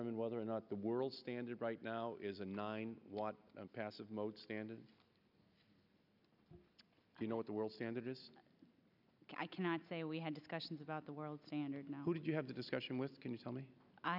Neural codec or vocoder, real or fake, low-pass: none; real; 5.4 kHz